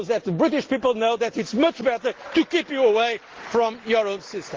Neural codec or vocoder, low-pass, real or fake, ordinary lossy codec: none; 7.2 kHz; real; Opus, 16 kbps